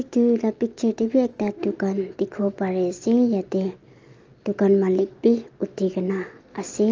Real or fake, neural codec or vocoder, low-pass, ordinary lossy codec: real; none; 7.2 kHz; Opus, 24 kbps